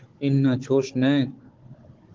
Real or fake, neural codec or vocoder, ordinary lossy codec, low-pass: fake; codec, 16 kHz, 16 kbps, FunCodec, trained on Chinese and English, 50 frames a second; Opus, 16 kbps; 7.2 kHz